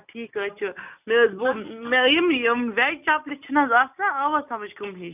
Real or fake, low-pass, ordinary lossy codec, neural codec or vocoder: real; 3.6 kHz; none; none